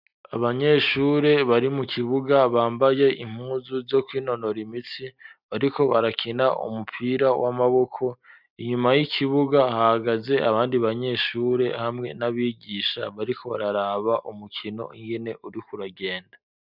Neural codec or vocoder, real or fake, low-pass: none; real; 5.4 kHz